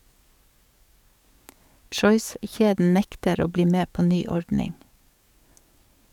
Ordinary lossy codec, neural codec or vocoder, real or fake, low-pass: none; codec, 44.1 kHz, 7.8 kbps, DAC; fake; 19.8 kHz